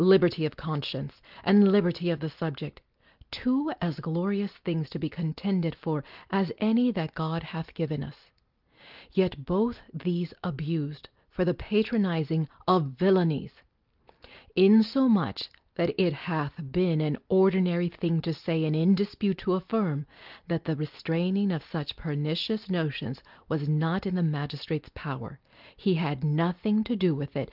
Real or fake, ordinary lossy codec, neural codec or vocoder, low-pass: real; Opus, 32 kbps; none; 5.4 kHz